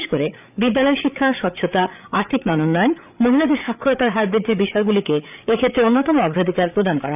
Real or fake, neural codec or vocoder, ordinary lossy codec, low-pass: fake; codec, 16 kHz, 8 kbps, FreqCodec, larger model; none; 3.6 kHz